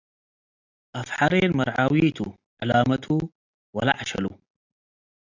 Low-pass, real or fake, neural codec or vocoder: 7.2 kHz; real; none